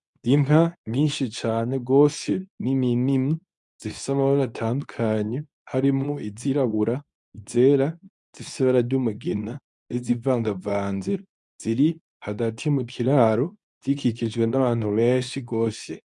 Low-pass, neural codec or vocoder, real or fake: 10.8 kHz; codec, 24 kHz, 0.9 kbps, WavTokenizer, medium speech release version 2; fake